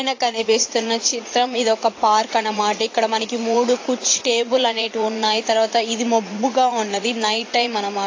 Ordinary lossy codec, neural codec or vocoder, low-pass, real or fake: AAC, 32 kbps; vocoder, 44.1 kHz, 80 mel bands, Vocos; 7.2 kHz; fake